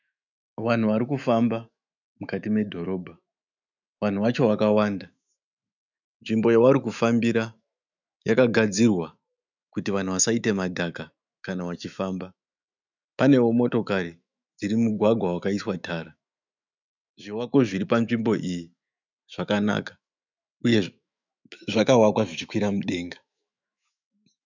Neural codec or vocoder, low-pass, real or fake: autoencoder, 48 kHz, 128 numbers a frame, DAC-VAE, trained on Japanese speech; 7.2 kHz; fake